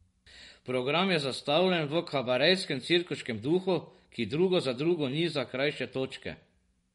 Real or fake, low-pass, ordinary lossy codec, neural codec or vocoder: fake; 19.8 kHz; MP3, 48 kbps; vocoder, 44.1 kHz, 128 mel bands every 512 samples, BigVGAN v2